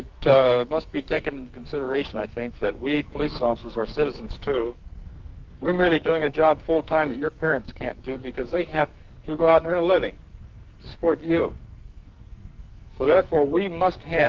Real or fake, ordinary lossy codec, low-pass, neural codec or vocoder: fake; Opus, 32 kbps; 7.2 kHz; codec, 44.1 kHz, 2.6 kbps, SNAC